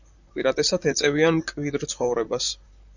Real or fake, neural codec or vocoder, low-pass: fake; vocoder, 44.1 kHz, 128 mel bands, Pupu-Vocoder; 7.2 kHz